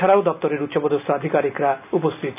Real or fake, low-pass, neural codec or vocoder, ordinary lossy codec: real; 3.6 kHz; none; none